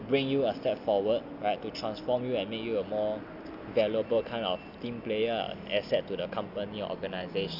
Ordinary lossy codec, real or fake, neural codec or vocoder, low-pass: none; real; none; 5.4 kHz